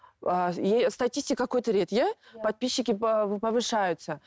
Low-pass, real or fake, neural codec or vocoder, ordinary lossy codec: none; real; none; none